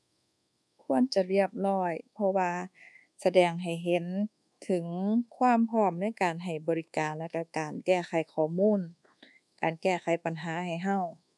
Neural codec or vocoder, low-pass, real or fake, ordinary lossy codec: codec, 24 kHz, 1.2 kbps, DualCodec; none; fake; none